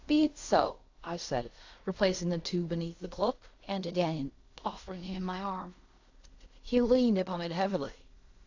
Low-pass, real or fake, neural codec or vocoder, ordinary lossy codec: 7.2 kHz; fake; codec, 16 kHz in and 24 kHz out, 0.4 kbps, LongCat-Audio-Codec, fine tuned four codebook decoder; AAC, 48 kbps